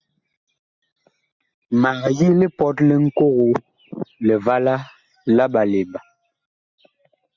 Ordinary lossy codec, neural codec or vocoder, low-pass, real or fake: Opus, 64 kbps; none; 7.2 kHz; real